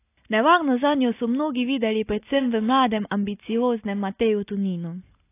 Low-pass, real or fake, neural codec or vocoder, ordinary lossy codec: 3.6 kHz; real; none; AAC, 24 kbps